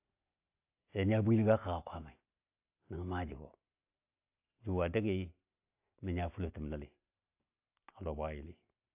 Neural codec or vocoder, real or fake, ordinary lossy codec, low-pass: none; real; AAC, 32 kbps; 3.6 kHz